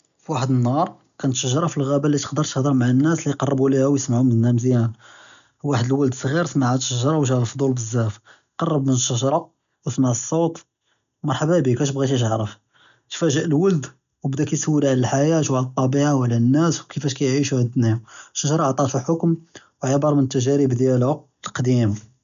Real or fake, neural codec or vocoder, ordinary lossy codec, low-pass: real; none; none; 7.2 kHz